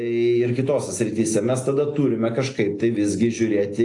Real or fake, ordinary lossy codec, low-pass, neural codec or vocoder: real; AAC, 48 kbps; 10.8 kHz; none